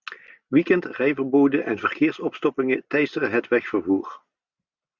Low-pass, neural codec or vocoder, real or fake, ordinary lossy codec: 7.2 kHz; none; real; Opus, 64 kbps